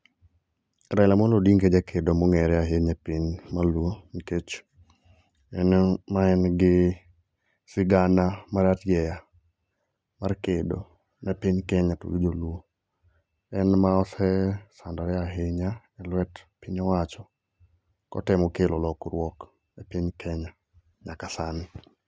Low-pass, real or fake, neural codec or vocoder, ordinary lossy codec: none; real; none; none